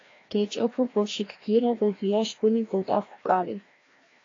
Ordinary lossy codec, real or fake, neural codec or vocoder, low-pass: AAC, 32 kbps; fake; codec, 16 kHz, 1 kbps, FreqCodec, larger model; 7.2 kHz